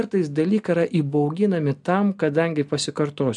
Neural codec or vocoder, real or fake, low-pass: none; real; 10.8 kHz